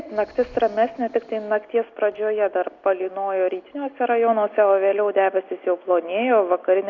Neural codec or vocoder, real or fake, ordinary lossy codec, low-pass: none; real; Opus, 64 kbps; 7.2 kHz